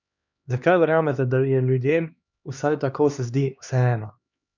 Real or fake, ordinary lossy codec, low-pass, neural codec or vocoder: fake; none; 7.2 kHz; codec, 16 kHz, 2 kbps, X-Codec, HuBERT features, trained on LibriSpeech